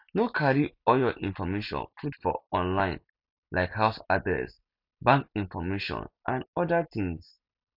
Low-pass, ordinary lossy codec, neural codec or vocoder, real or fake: 5.4 kHz; none; none; real